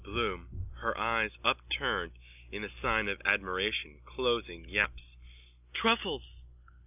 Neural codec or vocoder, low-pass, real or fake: none; 3.6 kHz; real